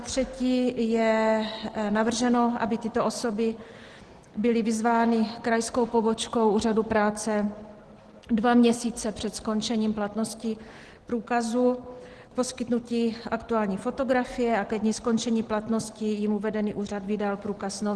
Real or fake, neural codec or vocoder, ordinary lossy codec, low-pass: real; none; Opus, 16 kbps; 10.8 kHz